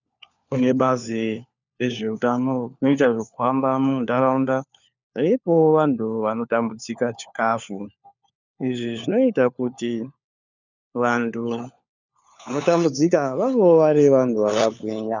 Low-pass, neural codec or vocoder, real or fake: 7.2 kHz; codec, 16 kHz, 4 kbps, FunCodec, trained on LibriTTS, 50 frames a second; fake